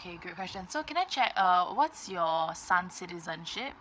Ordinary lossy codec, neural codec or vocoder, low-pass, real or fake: none; codec, 16 kHz, 8 kbps, FreqCodec, larger model; none; fake